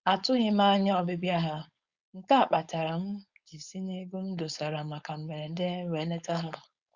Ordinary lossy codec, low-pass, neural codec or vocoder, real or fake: Opus, 64 kbps; 7.2 kHz; codec, 16 kHz, 4.8 kbps, FACodec; fake